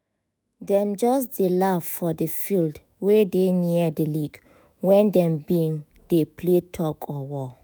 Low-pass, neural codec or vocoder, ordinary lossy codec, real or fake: none; autoencoder, 48 kHz, 128 numbers a frame, DAC-VAE, trained on Japanese speech; none; fake